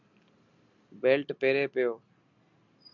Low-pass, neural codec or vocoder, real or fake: 7.2 kHz; none; real